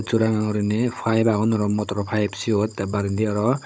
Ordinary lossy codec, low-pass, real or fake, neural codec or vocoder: none; none; fake; codec, 16 kHz, 16 kbps, FunCodec, trained on Chinese and English, 50 frames a second